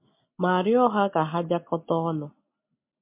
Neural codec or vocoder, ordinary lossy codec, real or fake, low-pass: none; MP3, 32 kbps; real; 3.6 kHz